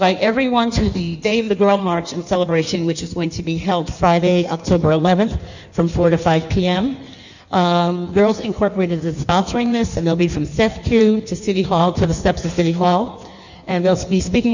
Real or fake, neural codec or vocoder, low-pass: fake; codec, 16 kHz in and 24 kHz out, 1.1 kbps, FireRedTTS-2 codec; 7.2 kHz